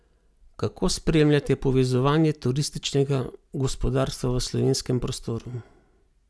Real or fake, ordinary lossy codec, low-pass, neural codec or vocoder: real; none; none; none